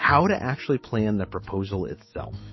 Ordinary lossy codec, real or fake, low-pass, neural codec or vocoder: MP3, 24 kbps; fake; 7.2 kHz; autoencoder, 48 kHz, 128 numbers a frame, DAC-VAE, trained on Japanese speech